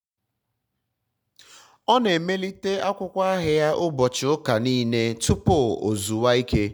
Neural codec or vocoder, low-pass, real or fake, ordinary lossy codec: none; 19.8 kHz; real; none